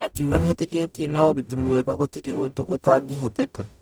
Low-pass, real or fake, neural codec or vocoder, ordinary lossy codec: none; fake; codec, 44.1 kHz, 0.9 kbps, DAC; none